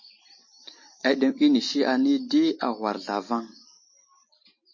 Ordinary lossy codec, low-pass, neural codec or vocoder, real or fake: MP3, 32 kbps; 7.2 kHz; none; real